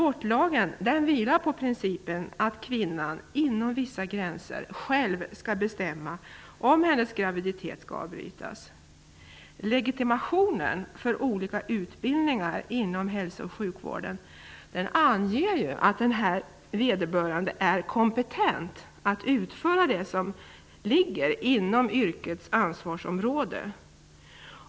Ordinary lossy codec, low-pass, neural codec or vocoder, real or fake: none; none; none; real